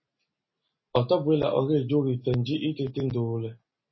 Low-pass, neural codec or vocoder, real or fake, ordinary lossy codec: 7.2 kHz; none; real; MP3, 24 kbps